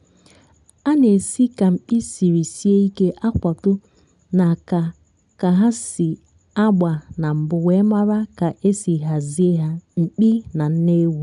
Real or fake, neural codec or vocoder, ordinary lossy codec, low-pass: real; none; none; 10.8 kHz